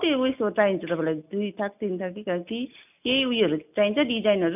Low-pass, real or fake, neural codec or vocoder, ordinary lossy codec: 3.6 kHz; real; none; none